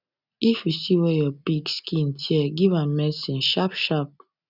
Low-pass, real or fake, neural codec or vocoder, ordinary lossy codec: 5.4 kHz; real; none; none